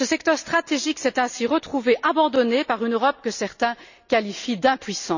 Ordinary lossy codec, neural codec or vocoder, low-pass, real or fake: none; none; 7.2 kHz; real